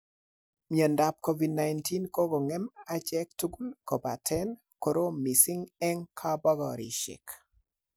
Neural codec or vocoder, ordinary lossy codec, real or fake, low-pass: none; none; real; none